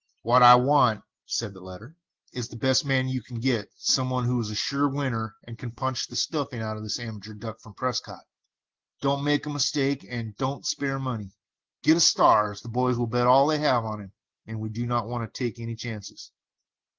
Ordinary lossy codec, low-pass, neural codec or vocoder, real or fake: Opus, 16 kbps; 7.2 kHz; none; real